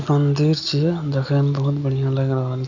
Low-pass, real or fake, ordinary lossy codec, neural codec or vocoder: 7.2 kHz; real; none; none